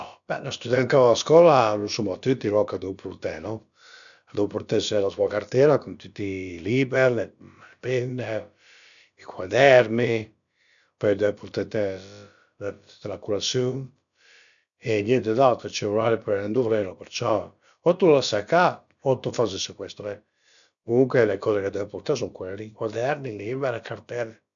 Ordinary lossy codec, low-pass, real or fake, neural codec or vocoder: none; 7.2 kHz; fake; codec, 16 kHz, about 1 kbps, DyCAST, with the encoder's durations